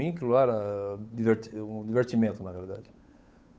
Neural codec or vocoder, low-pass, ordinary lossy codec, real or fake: codec, 16 kHz, 8 kbps, FunCodec, trained on Chinese and English, 25 frames a second; none; none; fake